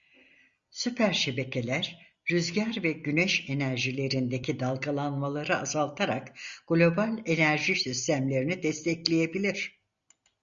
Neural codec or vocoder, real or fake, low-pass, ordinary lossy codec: none; real; 7.2 kHz; Opus, 64 kbps